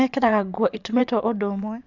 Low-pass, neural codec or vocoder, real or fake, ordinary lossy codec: 7.2 kHz; vocoder, 24 kHz, 100 mel bands, Vocos; fake; none